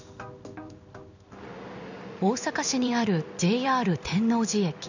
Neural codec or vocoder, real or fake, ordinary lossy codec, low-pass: codec, 16 kHz in and 24 kHz out, 1 kbps, XY-Tokenizer; fake; none; 7.2 kHz